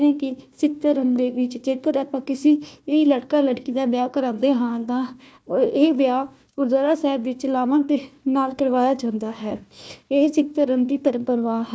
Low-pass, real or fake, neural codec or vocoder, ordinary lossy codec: none; fake; codec, 16 kHz, 1 kbps, FunCodec, trained on Chinese and English, 50 frames a second; none